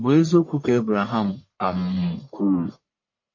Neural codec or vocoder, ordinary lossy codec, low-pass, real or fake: codec, 44.1 kHz, 1.7 kbps, Pupu-Codec; MP3, 32 kbps; 7.2 kHz; fake